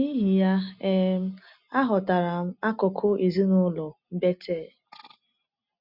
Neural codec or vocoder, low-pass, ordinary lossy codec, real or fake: none; 5.4 kHz; none; real